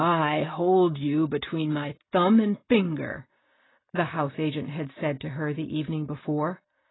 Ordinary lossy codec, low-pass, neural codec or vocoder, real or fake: AAC, 16 kbps; 7.2 kHz; none; real